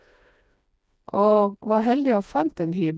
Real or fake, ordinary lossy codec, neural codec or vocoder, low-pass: fake; none; codec, 16 kHz, 2 kbps, FreqCodec, smaller model; none